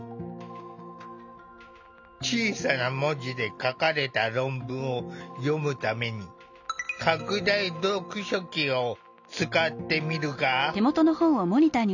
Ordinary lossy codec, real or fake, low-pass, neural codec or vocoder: none; real; 7.2 kHz; none